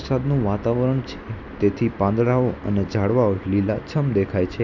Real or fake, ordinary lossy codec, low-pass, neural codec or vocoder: real; none; 7.2 kHz; none